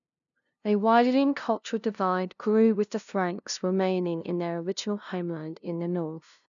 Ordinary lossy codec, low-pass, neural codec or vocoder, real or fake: none; 7.2 kHz; codec, 16 kHz, 0.5 kbps, FunCodec, trained on LibriTTS, 25 frames a second; fake